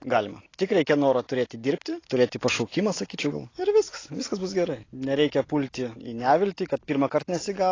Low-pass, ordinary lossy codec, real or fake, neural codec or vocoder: 7.2 kHz; AAC, 32 kbps; real; none